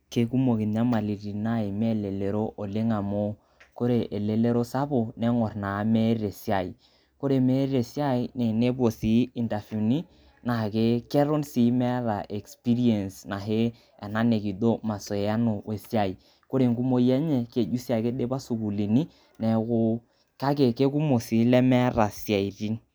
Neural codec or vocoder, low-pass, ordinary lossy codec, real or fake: none; none; none; real